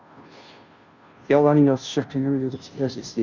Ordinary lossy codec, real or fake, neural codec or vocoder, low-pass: Opus, 64 kbps; fake; codec, 16 kHz, 0.5 kbps, FunCodec, trained on Chinese and English, 25 frames a second; 7.2 kHz